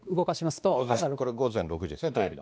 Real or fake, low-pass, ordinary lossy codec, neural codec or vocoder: fake; none; none; codec, 16 kHz, 2 kbps, X-Codec, WavLM features, trained on Multilingual LibriSpeech